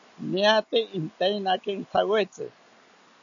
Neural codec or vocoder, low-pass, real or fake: none; 7.2 kHz; real